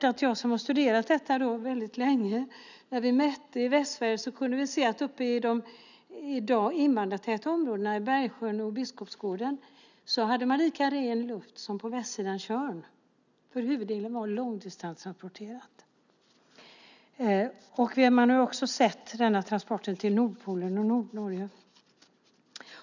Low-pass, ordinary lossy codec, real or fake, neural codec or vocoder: 7.2 kHz; none; real; none